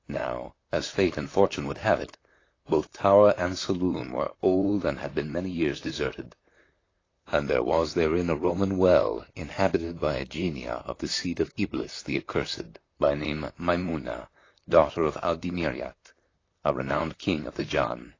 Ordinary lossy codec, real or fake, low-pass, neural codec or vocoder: AAC, 32 kbps; fake; 7.2 kHz; vocoder, 44.1 kHz, 128 mel bands, Pupu-Vocoder